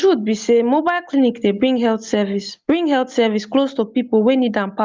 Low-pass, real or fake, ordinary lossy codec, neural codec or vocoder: 7.2 kHz; real; Opus, 24 kbps; none